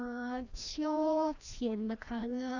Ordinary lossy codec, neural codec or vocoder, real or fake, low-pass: none; codec, 16 kHz, 1 kbps, FreqCodec, larger model; fake; 7.2 kHz